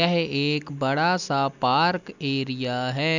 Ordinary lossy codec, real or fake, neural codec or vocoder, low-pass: none; real; none; 7.2 kHz